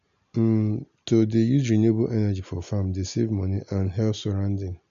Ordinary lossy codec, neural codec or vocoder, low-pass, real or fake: AAC, 96 kbps; none; 7.2 kHz; real